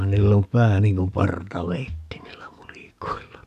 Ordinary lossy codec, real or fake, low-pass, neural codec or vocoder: none; fake; 14.4 kHz; codec, 44.1 kHz, 7.8 kbps, DAC